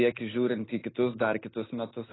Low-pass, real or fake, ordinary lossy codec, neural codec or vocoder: 7.2 kHz; real; AAC, 16 kbps; none